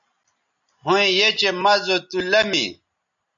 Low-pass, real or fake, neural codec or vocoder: 7.2 kHz; real; none